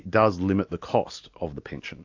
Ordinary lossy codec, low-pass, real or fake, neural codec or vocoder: AAC, 48 kbps; 7.2 kHz; real; none